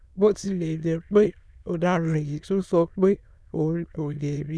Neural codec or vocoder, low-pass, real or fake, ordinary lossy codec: autoencoder, 22.05 kHz, a latent of 192 numbers a frame, VITS, trained on many speakers; none; fake; none